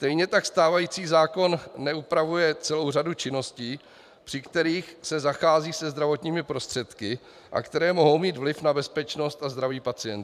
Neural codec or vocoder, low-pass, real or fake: vocoder, 44.1 kHz, 128 mel bands every 512 samples, BigVGAN v2; 14.4 kHz; fake